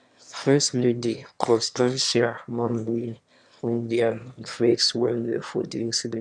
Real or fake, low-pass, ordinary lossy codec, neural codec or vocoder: fake; 9.9 kHz; none; autoencoder, 22.05 kHz, a latent of 192 numbers a frame, VITS, trained on one speaker